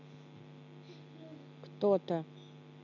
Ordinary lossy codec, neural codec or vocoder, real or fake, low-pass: none; none; real; 7.2 kHz